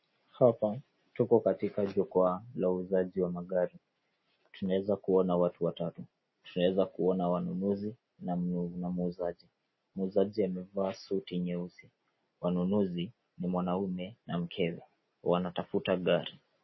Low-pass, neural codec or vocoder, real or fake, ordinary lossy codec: 7.2 kHz; none; real; MP3, 24 kbps